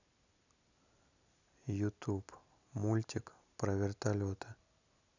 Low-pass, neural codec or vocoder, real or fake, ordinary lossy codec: 7.2 kHz; none; real; none